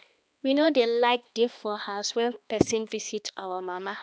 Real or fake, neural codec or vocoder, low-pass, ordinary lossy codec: fake; codec, 16 kHz, 2 kbps, X-Codec, HuBERT features, trained on balanced general audio; none; none